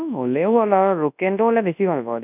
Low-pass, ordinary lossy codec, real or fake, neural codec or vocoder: 3.6 kHz; none; fake; codec, 24 kHz, 0.9 kbps, WavTokenizer, large speech release